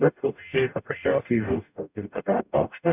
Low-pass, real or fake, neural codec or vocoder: 3.6 kHz; fake; codec, 44.1 kHz, 0.9 kbps, DAC